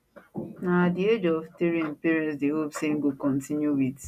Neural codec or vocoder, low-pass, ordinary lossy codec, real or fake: none; 14.4 kHz; none; real